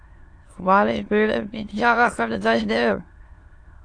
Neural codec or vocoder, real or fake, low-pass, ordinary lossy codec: autoencoder, 22.05 kHz, a latent of 192 numbers a frame, VITS, trained on many speakers; fake; 9.9 kHz; AAC, 48 kbps